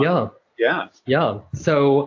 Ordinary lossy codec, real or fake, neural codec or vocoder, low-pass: AAC, 48 kbps; real; none; 7.2 kHz